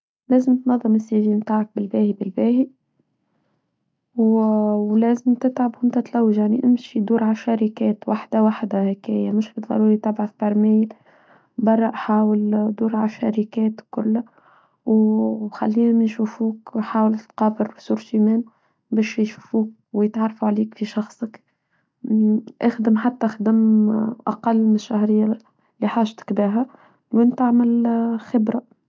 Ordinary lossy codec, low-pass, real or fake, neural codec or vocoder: none; none; real; none